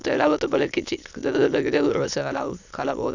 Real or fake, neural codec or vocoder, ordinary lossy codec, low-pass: fake; autoencoder, 22.05 kHz, a latent of 192 numbers a frame, VITS, trained on many speakers; none; 7.2 kHz